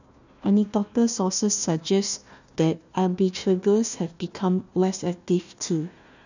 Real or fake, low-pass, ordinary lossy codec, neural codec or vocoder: fake; 7.2 kHz; none; codec, 16 kHz, 1 kbps, FunCodec, trained on Chinese and English, 50 frames a second